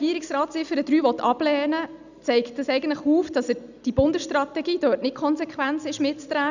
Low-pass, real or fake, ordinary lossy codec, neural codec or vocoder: 7.2 kHz; real; none; none